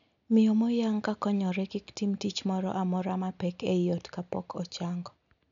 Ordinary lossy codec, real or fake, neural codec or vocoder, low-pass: none; real; none; 7.2 kHz